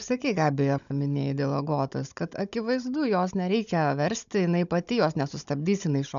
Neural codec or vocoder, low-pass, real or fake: codec, 16 kHz, 16 kbps, FunCodec, trained on Chinese and English, 50 frames a second; 7.2 kHz; fake